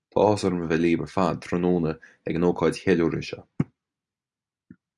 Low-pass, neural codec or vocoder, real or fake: 10.8 kHz; none; real